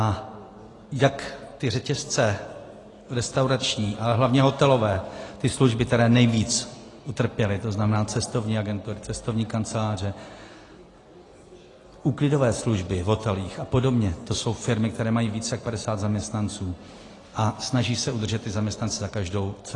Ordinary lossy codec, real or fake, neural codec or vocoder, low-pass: AAC, 32 kbps; real; none; 10.8 kHz